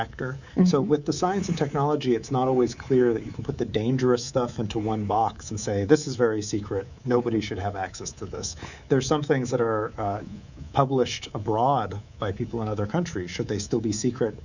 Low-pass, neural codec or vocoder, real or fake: 7.2 kHz; codec, 24 kHz, 3.1 kbps, DualCodec; fake